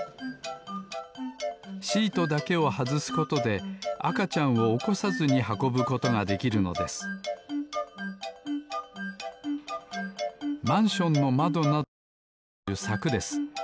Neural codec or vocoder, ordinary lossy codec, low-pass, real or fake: none; none; none; real